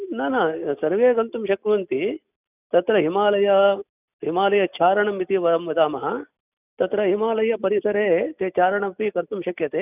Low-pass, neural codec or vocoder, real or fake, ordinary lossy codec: 3.6 kHz; none; real; none